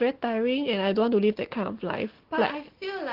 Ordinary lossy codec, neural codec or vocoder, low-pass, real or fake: Opus, 16 kbps; none; 5.4 kHz; real